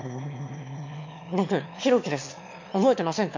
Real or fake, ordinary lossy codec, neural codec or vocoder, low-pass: fake; MP3, 48 kbps; autoencoder, 22.05 kHz, a latent of 192 numbers a frame, VITS, trained on one speaker; 7.2 kHz